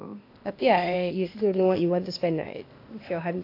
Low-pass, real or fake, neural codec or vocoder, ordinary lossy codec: 5.4 kHz; fake; codec, 16 kHz, 0.8 kbps, ZipCodec; AAC, 32 kbps